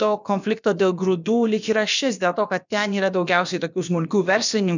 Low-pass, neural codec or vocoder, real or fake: 7.2 kHz; codec, 16 kHz, about 1 kbps, DyCAST, with the encoder's durations; fake